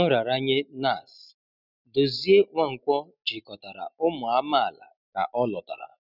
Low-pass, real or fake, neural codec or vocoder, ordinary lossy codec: 5.4 kHz; real; none; none